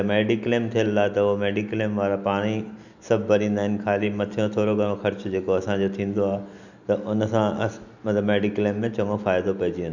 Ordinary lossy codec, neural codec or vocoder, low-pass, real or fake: none; none; 7.2 kHz; real